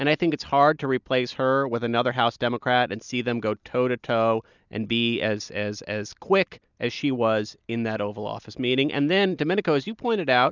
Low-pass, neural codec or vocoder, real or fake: 7.2 kHz; none; real